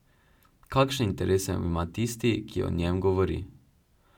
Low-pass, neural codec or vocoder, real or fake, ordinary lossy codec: 19.8 kHz; none; real; none